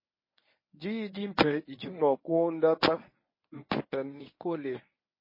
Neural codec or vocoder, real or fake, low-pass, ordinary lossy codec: codec, 24 kHz, 0.9 kbps, WavTokenizer, medium speech release version 1; fake; 5.4 kHz; MP3, 24 kbps